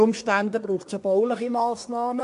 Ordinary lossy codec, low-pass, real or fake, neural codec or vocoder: AAC, 64 kbps; 10.8 kHz; fake; codec, 24 kHz, 1 kbps, SNAC